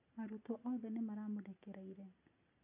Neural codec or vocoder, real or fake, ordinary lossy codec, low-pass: none; real; none; 3.6 kHz